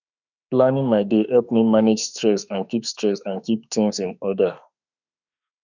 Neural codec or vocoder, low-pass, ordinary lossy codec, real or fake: autoencoder, 48 kHz, 32 numbers a frame, DAC-VAE, trained on Japanese speech; 7.2 kHz; none; fake